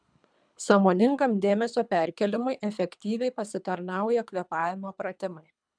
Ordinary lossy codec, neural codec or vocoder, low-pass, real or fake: MP3, 96 kbps; codec, 24 kHz, 3 kbps, HILCodec; 9.9 kHz; fake